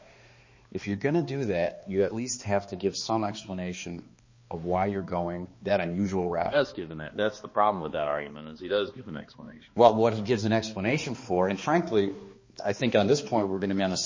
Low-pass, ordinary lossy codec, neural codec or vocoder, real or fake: 7.2 kHz; MP3, 32 kbps; codec, 16 kHz, 2 kbps, X-Codec, HuBERT features, trained on balanced general audio; fake